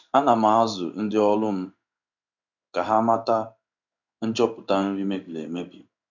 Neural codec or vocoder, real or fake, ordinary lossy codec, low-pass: codec, 16 kHz in and 24 kHz out, 1 kbps, XY-Tokenizer; fake; none; 7.2 kHz